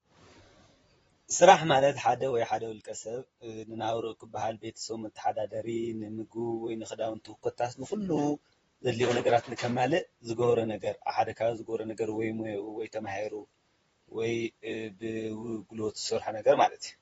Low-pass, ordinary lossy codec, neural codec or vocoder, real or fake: 19.8 kHz; AAC, 24 kbps; vocoder, 44.1 kHz, 128 mel bands, Pupu-Vocoder; fake